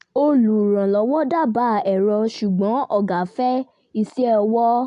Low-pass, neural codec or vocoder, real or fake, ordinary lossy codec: 10.8 kHz; none; real; AAC, 64 kbps